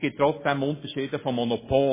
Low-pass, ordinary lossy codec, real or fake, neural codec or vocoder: 3.6 kHz; MP3, 16 kbps; real; none